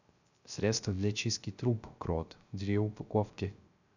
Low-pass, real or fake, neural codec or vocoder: 7.2 kHz; fake; codec, 16 kHz, 0.3 kbps, FocalCodec